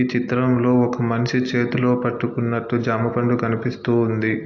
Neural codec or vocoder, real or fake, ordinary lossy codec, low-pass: none; real; AAC, 48 kbps; 7.2 kHz